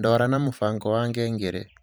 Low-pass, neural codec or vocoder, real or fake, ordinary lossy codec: none; vocoder, 44.1 kHz, 128 mel bands every 512 samples, BigVGAN v2; fake; none